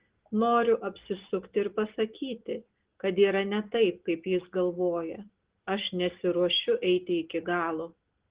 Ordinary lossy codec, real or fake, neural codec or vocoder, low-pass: Opus, 16 kbps; real; none; 3.6 kHz